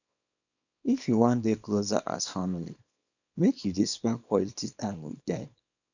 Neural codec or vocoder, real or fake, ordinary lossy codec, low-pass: codec, 24 kHz, 0.9 kbps, WavTokenizer, small release; fake; none; 7.2 kHz